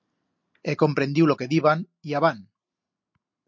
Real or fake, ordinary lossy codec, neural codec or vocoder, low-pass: real; MP3, 48 kbps; none; 7.2 kHz